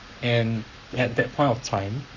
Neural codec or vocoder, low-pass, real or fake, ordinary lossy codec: codec, 44.1 kHz, 7.8 kbps, Pupu-Codec; 7.2 kHz; fake; none